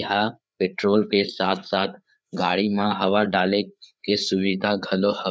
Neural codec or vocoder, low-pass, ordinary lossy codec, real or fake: codec, 16 kHz, 4 kbps, FreqCodec, larger model; none; none; fake